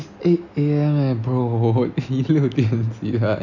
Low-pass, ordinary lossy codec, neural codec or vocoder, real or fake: 7.2 kHz; none; none; real